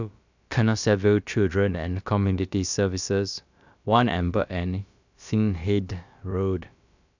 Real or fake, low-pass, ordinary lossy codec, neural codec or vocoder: fake; 7.2 kHz; none; codec, 16 kHz, about 1 kbps, DyCAST, with the encoder's durations